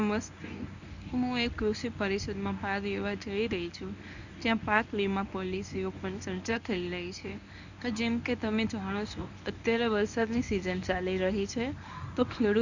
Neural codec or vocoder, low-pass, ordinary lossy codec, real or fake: codec, 24 kHz, 0.9 kbps, WavTokenizer, medium speech release version 1; 7.2 kHz; none; fake